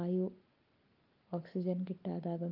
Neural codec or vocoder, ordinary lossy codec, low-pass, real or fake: none; Opus, 32 kbps; 5.4 kHz; real